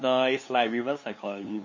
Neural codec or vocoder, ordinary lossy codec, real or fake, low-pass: codec, 16 kHz, 2 kbps, FunCodec, trained on LibriTTS, 25 frames a second; MP3, 32 kbps; fake; 7.2 kHz